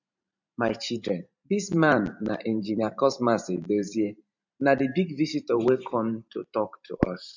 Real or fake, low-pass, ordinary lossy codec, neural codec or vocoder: fake; 7.2 kHz; MP3, 64 kbps; vocoder, 44.1 kHz, 128 mel bands every 512 samples, BigVGAN v2